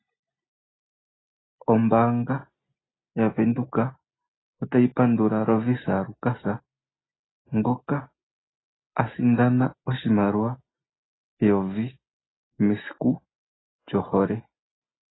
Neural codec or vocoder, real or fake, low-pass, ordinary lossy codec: none; real; 7.2 kHz; AAC, 16 kbps